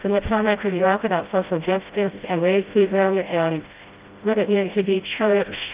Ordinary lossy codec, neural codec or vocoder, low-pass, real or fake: Opus, 32 kbps; codec, 16 kHz, 0.5 kbps, FreqCodec, smaller model; 3.6 kHz; fake